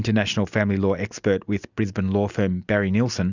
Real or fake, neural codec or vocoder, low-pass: real; none; 7.2 kHz